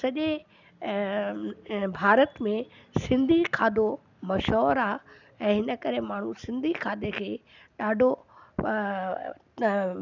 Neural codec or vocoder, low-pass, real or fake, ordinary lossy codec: none; 7.2 kHz; real; none